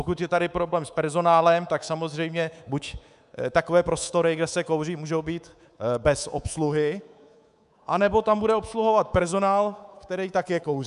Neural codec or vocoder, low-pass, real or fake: codec, 24 kHz, 3.1 kbps, DualCodec; 10.8 kHz; fake